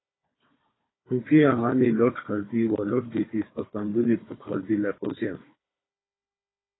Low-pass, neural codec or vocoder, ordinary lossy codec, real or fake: 7.2 kHz; codec, 16 kHz, 4 kbps, FunCodec, trained on Chinese and English, 50 frames a second; AAC, 16 kbps; fake